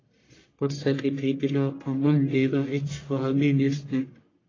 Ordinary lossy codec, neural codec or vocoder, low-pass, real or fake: AAC, 32 kbps; codec, 44.1 kHz, 1.7 kbps, Pupu-Codec; 7.2 kHz; fake